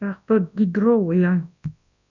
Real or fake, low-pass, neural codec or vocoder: fake; 7.2 kHz; codec, 24 kHz, 0.9 kbps, WavTokenizer, large speech release